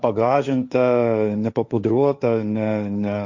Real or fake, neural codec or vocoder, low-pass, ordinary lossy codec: fake; codec, 16 kHz, 1.1 kbps, Voila-Tokenizer; 7.2 kHz; Opus, 64 kbps